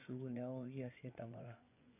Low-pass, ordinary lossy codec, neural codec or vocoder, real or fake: 3.6 kHz; none; vocoder, 24 kHz, 100 mel bands, Vocos; fake